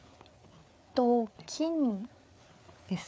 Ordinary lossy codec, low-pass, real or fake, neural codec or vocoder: none; none; fake; codec, 16 kHz, 4 kbps, FreqCodec, larger model